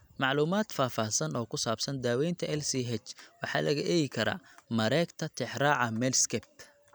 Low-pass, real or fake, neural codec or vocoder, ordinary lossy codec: none; real; none; none